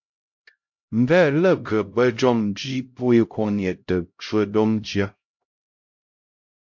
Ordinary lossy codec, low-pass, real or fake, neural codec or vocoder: MP3, 48 kbps; 7.2 kHz; fake; codec, 16 kHz, 0.5 kbps, X-Codec, HuBERT features, trained on LibriSpeech